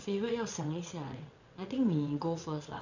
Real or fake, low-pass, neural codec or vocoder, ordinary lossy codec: fake; 7.2 kHz; vocoder, 22.05 kHz, 80 mel bands, WaveNeXt; none